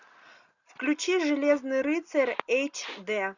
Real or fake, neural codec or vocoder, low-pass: real; none; 7.2 kHz